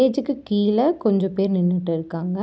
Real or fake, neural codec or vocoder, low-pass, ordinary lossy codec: real; none; none; none